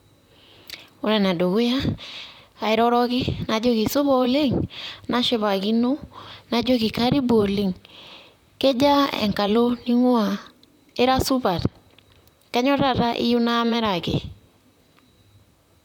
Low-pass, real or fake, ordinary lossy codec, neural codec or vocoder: 19.8 kHz; fake; none; vocoder, 44.1 kHz, 128 mel bands, Pupu-Vocoder